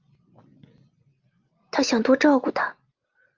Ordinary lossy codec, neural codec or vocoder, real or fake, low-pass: Opus, 24 kbps; none; real; 7.2 kHz